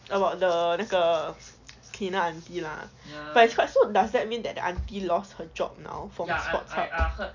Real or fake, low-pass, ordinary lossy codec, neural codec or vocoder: real; 7.2 kHz; none; none